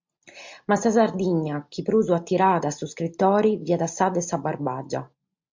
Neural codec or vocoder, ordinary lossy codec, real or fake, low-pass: none; MP3, 48 kbps; real; 7.2 kHz